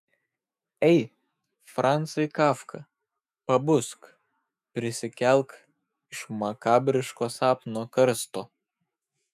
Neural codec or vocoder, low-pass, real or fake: autoencoder, 48 kHz, 128 numbers a frame, DAC-VAE, trained on Japanese speech; 14.4 kHz; fake